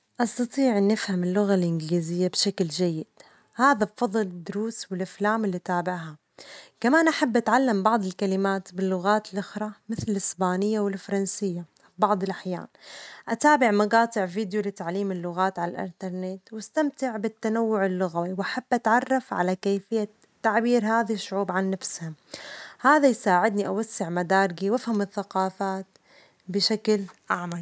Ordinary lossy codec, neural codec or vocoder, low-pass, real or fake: none; none; none; real